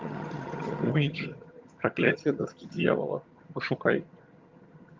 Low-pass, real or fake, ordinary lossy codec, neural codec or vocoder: 7.2 kHz; fake; Opus, 24 kbps; vocoder, 22.05 kHz, 80 mel bands, HiFi-GAN